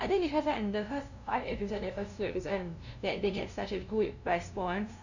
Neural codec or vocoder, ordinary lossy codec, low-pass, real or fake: codec, 16 kHz, 0.5 kbps, FunCodec, trained on LibriTTS, 25 frames a second; none; 7.2 kHz; fake